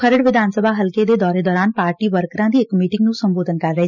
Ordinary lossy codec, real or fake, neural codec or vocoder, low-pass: none; real; none; 7.2 kHz